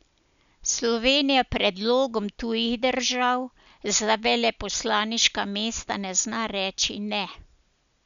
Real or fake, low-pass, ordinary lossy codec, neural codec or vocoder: real; 7.2 kHz; none; none